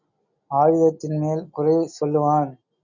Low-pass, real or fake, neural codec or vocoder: 7.2 kHz; real; none